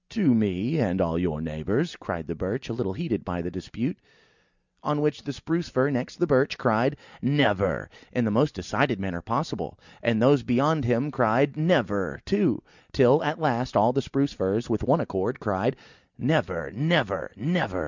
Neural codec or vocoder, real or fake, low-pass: none; real; 7.2 kHz